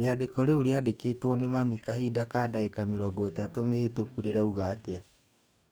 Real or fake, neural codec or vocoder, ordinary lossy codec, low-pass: fake; codec, 44.1 kHz, 2.6 kbps, DAC; none; none